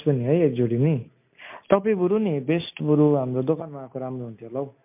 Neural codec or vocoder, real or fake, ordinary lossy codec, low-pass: none; real; MP3, 32 kbps; 3.6 kHz